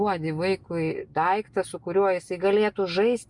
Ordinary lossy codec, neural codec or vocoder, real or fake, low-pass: Opus, 64 kbps; none; real; 10.8 kHz